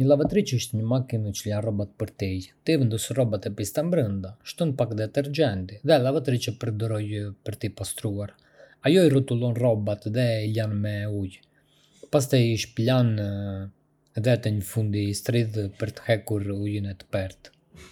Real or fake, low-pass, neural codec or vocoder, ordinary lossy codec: real; 19.8 kHz; none; none